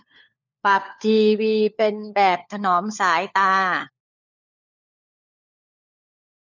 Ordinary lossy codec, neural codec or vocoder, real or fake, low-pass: none; codec, 16 kHz, 4 kbps, FunCodec, trained on LibriTTS, 50 frames a second; fake; 7.2 kHz